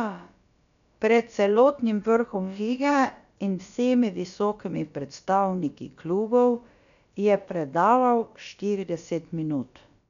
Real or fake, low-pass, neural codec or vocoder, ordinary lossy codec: fake; 7.2 kHz; codec, 16 kHz, about 1 kbps, DyCAST, with the encoder's durations; none